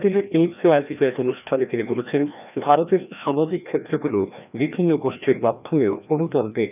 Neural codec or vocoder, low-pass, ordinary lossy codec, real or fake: codec, 16 kHz, 1 kbps, FreqCodec, larger model; 3.6 kHz; none; fake